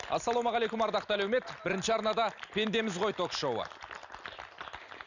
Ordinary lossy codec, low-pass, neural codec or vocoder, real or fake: none; 7.2 kHz; none; real